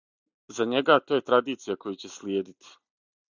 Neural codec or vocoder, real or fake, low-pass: none; real; 7.2 kHz